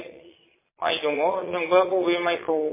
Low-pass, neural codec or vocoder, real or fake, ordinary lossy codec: 3.6 kHz; vocoder, 22.05 kHz, 80 mel bands, Vocos; fake; MP3, 16 kbps